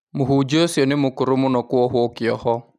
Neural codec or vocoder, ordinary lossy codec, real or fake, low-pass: none; none; real; 14.4 kHz